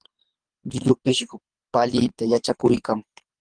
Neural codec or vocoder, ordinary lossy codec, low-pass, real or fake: codec, 24 kHz, 3 kbps, HILCodec; Opus, 32 kbps; 9.9 kHz; fake